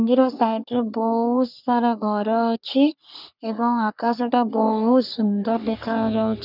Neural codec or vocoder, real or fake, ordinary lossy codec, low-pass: codec, 44.1 kHz, 3.4 kbps, Pupu-Codec; fake; none; 5.4 kHz